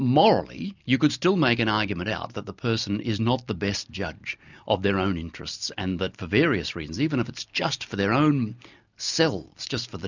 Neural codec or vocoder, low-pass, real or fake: none; 7.2 kHz; real